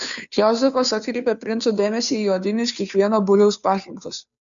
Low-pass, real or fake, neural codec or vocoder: 7.2 kHz; fake; codec, 16 kHz, 2 kbps, FunCodec, trained on Chinese and English, 25 frames a second